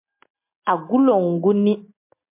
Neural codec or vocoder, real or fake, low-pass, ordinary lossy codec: none; real; 3.6 kHz; MP3, 32 kbps